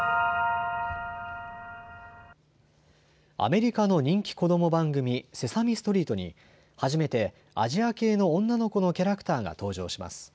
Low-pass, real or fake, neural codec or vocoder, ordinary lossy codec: none; real; none; none